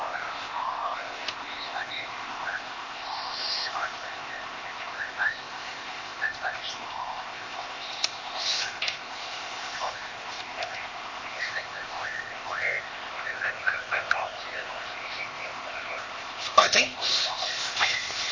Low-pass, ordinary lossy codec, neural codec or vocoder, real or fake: 7.2 kHz; MP3, 32 kbps; codec, 16 kHz, 0.8 kbps, ZipCodec; fake